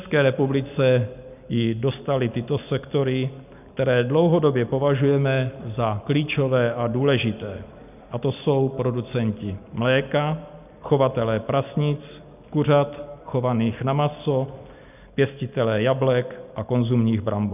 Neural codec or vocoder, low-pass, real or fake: none; 3.6 kHz; real